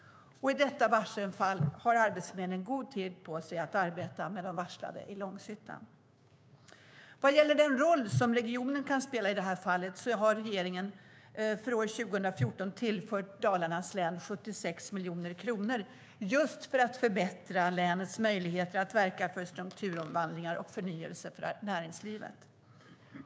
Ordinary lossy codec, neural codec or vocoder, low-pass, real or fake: none; codec, 16 kHz, 6 kbps, DAC; none; fake